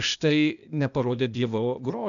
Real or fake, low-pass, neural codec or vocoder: fake; 7.2 kHz; codec, 16 kHz, 0.8 kbps, ZipCodec